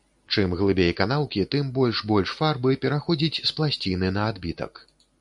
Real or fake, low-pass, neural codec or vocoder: real; 10.8 kHz; none